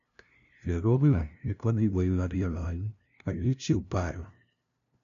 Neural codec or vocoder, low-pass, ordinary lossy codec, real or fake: codec, 16 kHz, 0.5 kbps, FunCodec, trained on LibriTTS, 25 frames a second; 7.2 kHz; none; fake